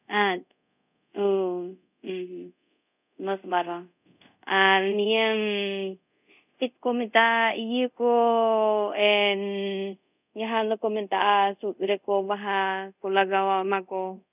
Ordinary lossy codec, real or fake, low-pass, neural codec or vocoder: none; fake; 3.6 kHz; codec, 24 kHz, 0.5 kbps, DualCodec